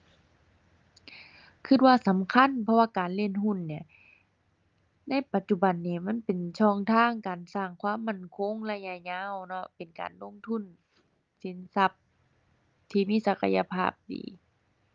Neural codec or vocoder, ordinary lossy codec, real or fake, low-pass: none; Opus, 32 kbps; real; 7.2 kHz